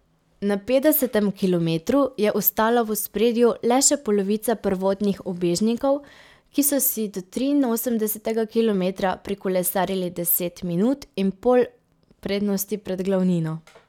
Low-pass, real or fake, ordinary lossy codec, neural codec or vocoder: 19.8 kHz; real; none; none